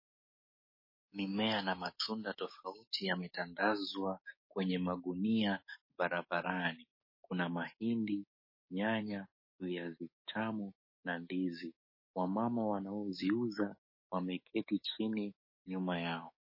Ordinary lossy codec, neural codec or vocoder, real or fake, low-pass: MP3, 24 kbps; codec, 16 kHz, 6 kbps, DAC; fake; 5.4 kHz